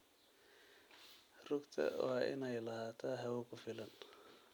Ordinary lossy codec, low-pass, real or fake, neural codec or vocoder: none; none; real; none